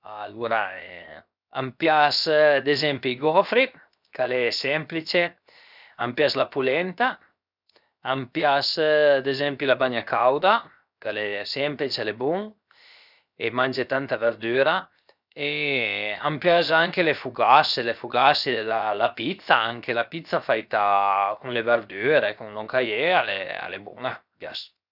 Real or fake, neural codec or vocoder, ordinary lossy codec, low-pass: fake; codec, 16 kHz, 0.7 kbps, FocalCodec; none; 5.4 kHz